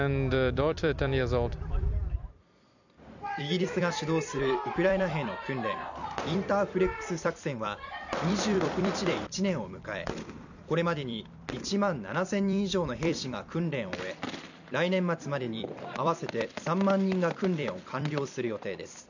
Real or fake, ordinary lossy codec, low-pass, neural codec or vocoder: fake; none; 7.2 kHz; vocoder, 44.1 kHz, 128 mel bands every 256 samples, BigVGAN v2